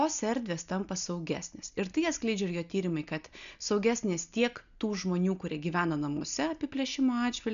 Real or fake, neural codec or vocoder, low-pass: real; none; 7.2 kHz